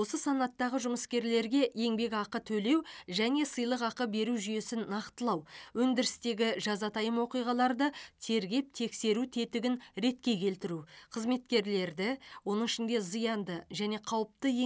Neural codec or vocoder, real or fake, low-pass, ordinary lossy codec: none; real; none; none